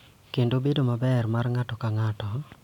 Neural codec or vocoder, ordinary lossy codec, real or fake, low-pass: none; none; real; 19.8 kHz